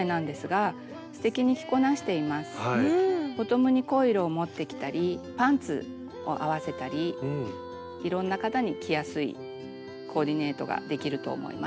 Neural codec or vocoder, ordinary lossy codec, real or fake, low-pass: none; none; real; none